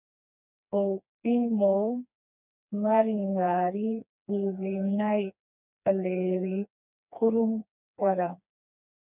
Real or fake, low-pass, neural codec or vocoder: fake; 3.6 kHz; codec, 16 kHz, 2 kbps, FreqCodec, smaller model